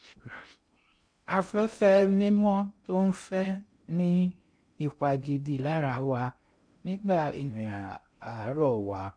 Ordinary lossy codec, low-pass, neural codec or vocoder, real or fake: MP3, 64 kbps; 9.9 kHz; codec, 16 kHz in and 24 kHz out, 0.6 kbps, FocalCodec, streaming, 2048 codes; fake